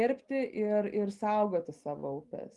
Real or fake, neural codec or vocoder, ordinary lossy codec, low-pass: real; none; Opus, 32 kbps; 10.8 kHz